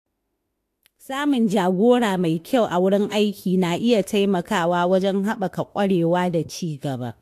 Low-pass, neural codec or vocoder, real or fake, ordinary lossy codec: 14.4 kHz; autoencoder, 48 kHz, 32 numbers a frame, DAC-VAE, trained on Japanese speech; fake; AAC, 64 kbps